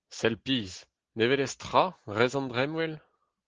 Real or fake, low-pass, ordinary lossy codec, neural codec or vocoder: real; 7.2 kHz; Opus, 16 kbps; none